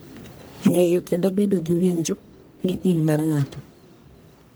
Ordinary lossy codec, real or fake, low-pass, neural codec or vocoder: none; fake; none; codec, 44.1 kHz, 1.7 kbps, Pupu-Codec